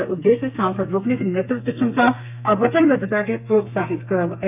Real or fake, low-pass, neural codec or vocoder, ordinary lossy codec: fake; 3.6 kHz; codec, 32 kHz, 1.9 kbps, SNAC; AAC, 32 kbps